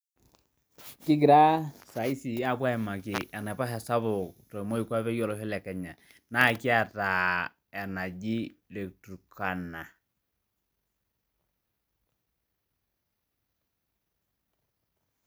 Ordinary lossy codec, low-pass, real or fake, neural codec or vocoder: none; none; real; none